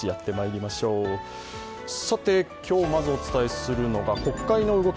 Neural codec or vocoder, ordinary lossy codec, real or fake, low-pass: none; none; real; none